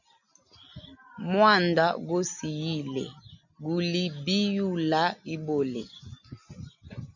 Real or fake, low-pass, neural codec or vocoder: real; 7.2 kHz; none